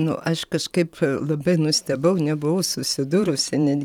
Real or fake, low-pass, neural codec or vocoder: fake; 19.8 kHz; vocoder, 44.1 kHz, 128 mel bands every 512 samples, BigVGAN v2